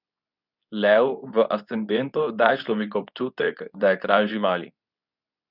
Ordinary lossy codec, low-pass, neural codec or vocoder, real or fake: none; 5.4 kHz; codec, 24 kHz, 0.9 kbps, WavTokenizer, medium speech release version 2; fake